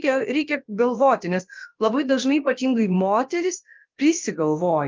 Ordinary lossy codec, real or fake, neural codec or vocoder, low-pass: Opus, 32 kbps; fake; codec, 16 kHz, about 1 kbps, DyCAST, with the encoder's durations; 7.2 kHz